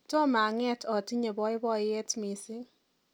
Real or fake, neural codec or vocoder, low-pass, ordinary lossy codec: real; none; none; none